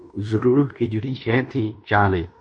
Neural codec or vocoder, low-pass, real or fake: codec, 16 kHz in and 24 kHz out, 0.9 kbps, LongCat-Audio-Codec, fine tuned four codebook decoder; 9.9 kHz; fake